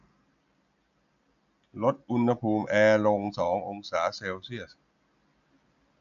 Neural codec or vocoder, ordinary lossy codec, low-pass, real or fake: none; none; 7.2 kHz; real